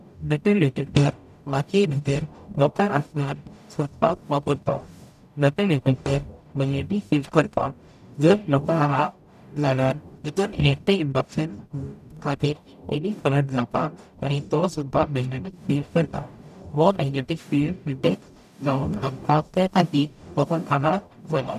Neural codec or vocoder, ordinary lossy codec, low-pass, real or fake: codec, 44.1 kHz, 0.9 kbps, DAC; none; 14.4 kHz; fake